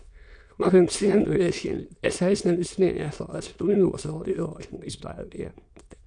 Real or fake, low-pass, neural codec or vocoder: fake; 9.9 kHz; autoencoder, 22.05 kHz, a latent of 192 numbers a frame, VITS, trained on many speakers